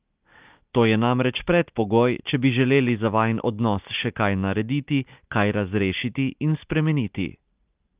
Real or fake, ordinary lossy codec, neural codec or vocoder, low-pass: real; Opus, 32 kbps; none; 3.6 kHz